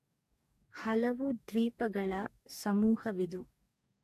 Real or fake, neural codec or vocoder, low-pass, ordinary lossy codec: fake; codec, 44.1 kHz, 2.6 kbps, DAC; 14.4 kHz; AAC, 64 kbps